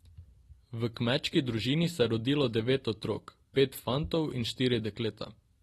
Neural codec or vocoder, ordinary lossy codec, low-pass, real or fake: none; AAC, 32 kbps; 19.8 kHz; real